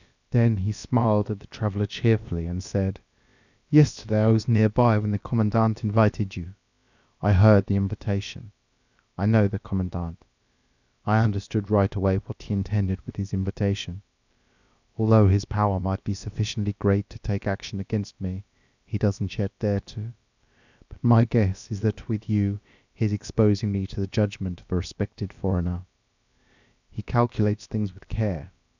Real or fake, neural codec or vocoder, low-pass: fake; codec, 16 kHz, about 1 kbps, DyCAST, with the encoder's durations; 7.2 kHz